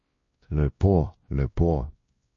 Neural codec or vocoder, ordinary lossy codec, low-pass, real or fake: codec, 16 kHz, 1 kbps, X-Codec, WavLM features, trained on Multilingual LibriSpeech; MP3, 32 kbps; 7.2 kHz; fake